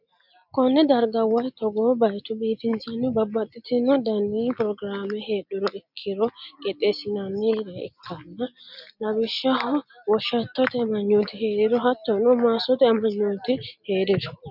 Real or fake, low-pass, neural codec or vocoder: real; 5.4 kHz; none